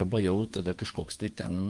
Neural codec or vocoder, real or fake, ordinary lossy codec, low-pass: codec, 24 kHz, 1 kbps, SNAC; fake; Opus, 32 kbps; 10.8 kHz